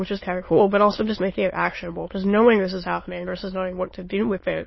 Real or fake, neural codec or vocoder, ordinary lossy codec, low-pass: fake; autoencoder, 22.05 kHz, a latent of 192 numbers a frame, VITS, trained on many speakers; MP3, 24 kbps; 7.2 kHz